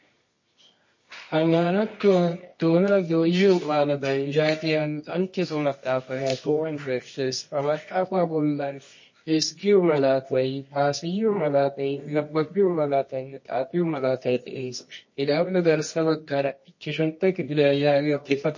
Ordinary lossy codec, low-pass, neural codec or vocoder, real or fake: MP3, 32 kbps; 7.2 kHz; codec, 24 kHz, 0.9 kbps, WavTokenizer, medium music audio release; fake